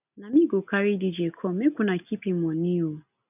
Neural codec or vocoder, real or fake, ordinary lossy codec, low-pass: none; real; none; 3.6 kHz